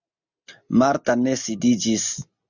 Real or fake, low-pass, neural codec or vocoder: real; 7.2 kHz; none